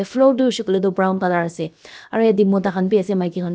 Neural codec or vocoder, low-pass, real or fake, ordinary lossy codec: codec, 16 kHz, about 1 kbps, DyCAST, with the encoder's durations; none; fake; none